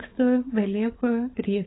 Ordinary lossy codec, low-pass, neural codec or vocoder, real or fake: AAC, 16 kbps; 7.2 kHz; codec, 16 kHz, 16 kbps, FunCodec, trained on LibriTTS, 50 frames a second; fake